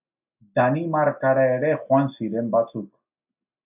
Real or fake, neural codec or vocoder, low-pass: real; none; 3.6 kHz